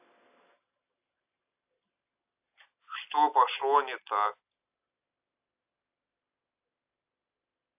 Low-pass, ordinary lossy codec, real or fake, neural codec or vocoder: 3.6 kHz; AAC, 32 kbps; real; none